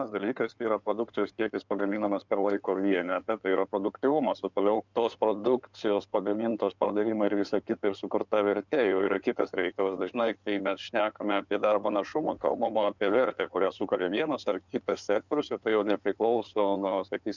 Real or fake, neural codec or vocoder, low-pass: fake; codec, 16 kHz in and 24 kHz out, 2.2 kbps, FireRedTTS-2 codec; 7.2 kHz